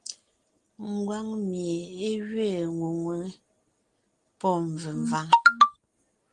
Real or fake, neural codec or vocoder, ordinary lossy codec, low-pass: real; none; Opus, 24 kbps; 10.8 kHz